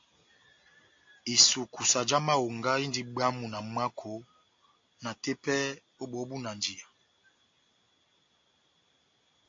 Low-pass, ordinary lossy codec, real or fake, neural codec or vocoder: 7.2 kHz; AAC, 48 kbps; real; none